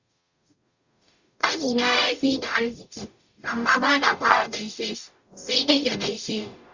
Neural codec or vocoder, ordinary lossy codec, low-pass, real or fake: codec, 44.1 kHz, 0.9 kbps, DAC; Opus, 64 kbps; 7.2 kHz; fake